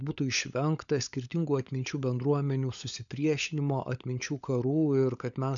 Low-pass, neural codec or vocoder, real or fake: 7.2 kHz; codec, 16 kHz, 16 kbps, FunCodec, trained on LibriTTS, 50 frames a second; fake